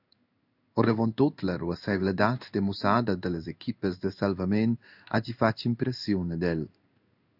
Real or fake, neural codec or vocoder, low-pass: fake; codec, 16 kHz in and 24 kHz out, 1 kbps, XY-Tokenizer; 5.4 kHz